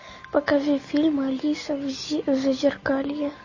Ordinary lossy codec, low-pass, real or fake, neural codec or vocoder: MP3, 32 kbps; 7.2 kHz; real; none